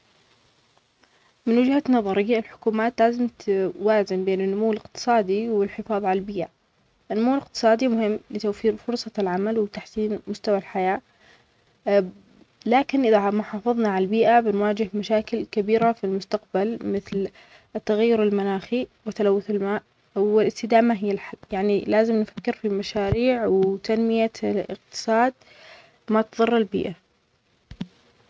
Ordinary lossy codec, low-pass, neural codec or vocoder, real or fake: none; none; none; real